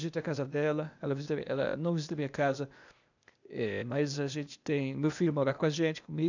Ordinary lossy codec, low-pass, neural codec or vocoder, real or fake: none; 7.2 kHz; codec, 16 kHz, 0.8 kbps, ZipCodec; fake